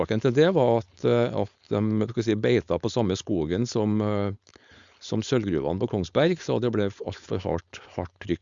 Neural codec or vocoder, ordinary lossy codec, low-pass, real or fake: codec, 16 kHz, 4.8 kbps, FACodec; Opus, 64 kbps; 7.2 kHz; fake